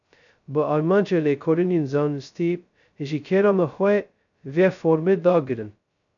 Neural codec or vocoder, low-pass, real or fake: codec, 16 kHz, 0.2 kbps, FocalCodec; 7.2 kHz; fake